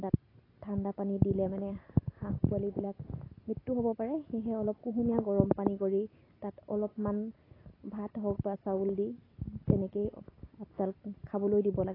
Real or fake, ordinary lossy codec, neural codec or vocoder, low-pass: real; AAC, 24 kbps; none; 5.4 kHz